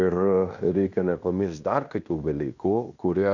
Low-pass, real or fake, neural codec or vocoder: 7.2 kHz; fake; codec, 16 kHz in and 24 kHz out, 0.9 kbps, LongCat-Audio-Codec, fine tuned four codebook decoder